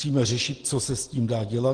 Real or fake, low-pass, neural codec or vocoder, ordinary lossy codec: real; 9.9 kHz; none; Opus, 16 kbps